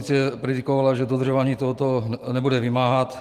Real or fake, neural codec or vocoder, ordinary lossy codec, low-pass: fake; vocoder, 44.1 kHz, 128 mel bands every 512 samples, BigVGAN v2; Opus, 24 kbps; 14.4 kHz